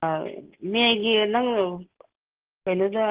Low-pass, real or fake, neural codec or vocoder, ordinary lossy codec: 3.6 kHz; real; none; Opus, 16 kbps